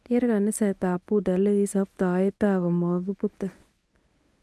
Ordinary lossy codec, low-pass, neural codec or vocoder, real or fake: none; none; codec, 24 kHz, 0.9 kbps, WavTokenizer, medium speech release version 1; fake